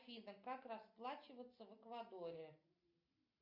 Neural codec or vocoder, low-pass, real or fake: none; 5.4 kHz; real